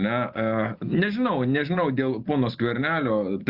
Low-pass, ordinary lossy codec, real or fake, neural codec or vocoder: 5.4 kHz; Opus, 64 kbps; real; none